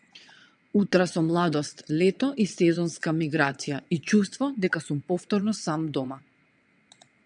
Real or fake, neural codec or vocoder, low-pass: fake; vocoder, 22.05 kHz, 80 mel bands, WaveNeXt; 9.9 kHz